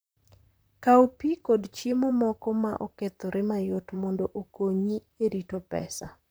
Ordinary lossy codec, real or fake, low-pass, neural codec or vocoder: none; fake; none; vocoder, 44.1 kHz, 128 mel bands, Pupu-Vocoder